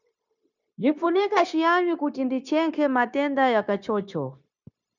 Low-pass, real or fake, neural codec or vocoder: 7.2 kHz; fake; codec, 16 kHz, 0.9 kbps, LongCat-Audio-Codec